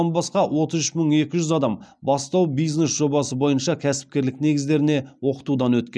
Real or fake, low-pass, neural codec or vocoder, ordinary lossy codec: real; none; none; none